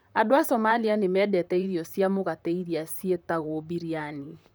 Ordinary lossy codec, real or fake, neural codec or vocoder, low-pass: none; fake; vocoder, 44.1 kHz, 128 mel bands, Pupu-Vocoder; none